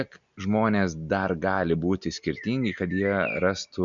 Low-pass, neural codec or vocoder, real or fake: 7.2 kHz; none; real